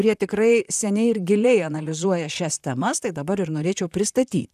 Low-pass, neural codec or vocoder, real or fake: 14.4 kHz; vocoder, 44.1 kHz, 128 mel bands, Pupu-Vocoder; fake